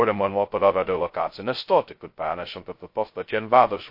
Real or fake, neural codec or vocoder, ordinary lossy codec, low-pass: fake; codec, 16 kHz, 0.2 kbps, FocalCodec; MP3, 32 kbps; 5.4 kHz